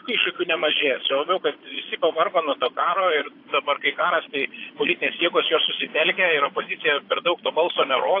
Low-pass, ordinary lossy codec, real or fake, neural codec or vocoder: 5.4 kHz; AAC, 32 kbps; fake; vocoder, 22.05 kHz, 80 mel bands, Vocos